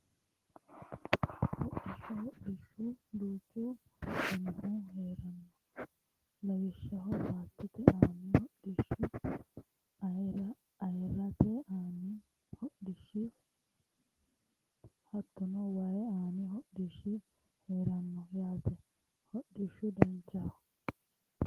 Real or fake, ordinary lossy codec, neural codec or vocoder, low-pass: real; Opus, 16 kbps; none; 14.4 kHz